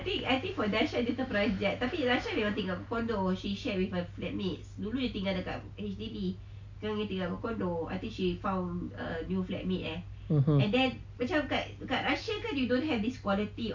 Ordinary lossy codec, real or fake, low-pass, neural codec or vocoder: AAC, 48 kbps; real; 7.2 kHz; none